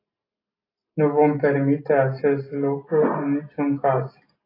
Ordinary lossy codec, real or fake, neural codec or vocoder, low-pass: AAC, 24 kbps; real; none; 5.4 kHz